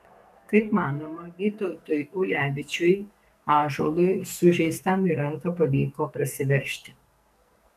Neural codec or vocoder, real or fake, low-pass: codec, 44.1 kHz, 2.6 kbps, SNAC; fake; 14.4 kHz